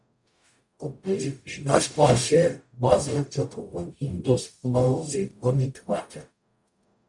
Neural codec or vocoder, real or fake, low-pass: codec, 44.1 kHz, 0.9 kbps, DAC; fake; 10.8 kHz